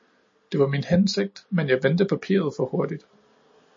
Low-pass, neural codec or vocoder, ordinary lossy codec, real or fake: 7.2 kHz; none; MP3, 32 kbps; real